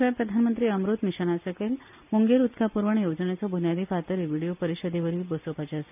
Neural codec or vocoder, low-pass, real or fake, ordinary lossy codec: none; 3.6 kHz; real; none